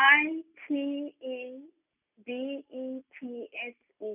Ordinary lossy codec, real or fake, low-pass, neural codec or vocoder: none; real; 3.6 kHz; none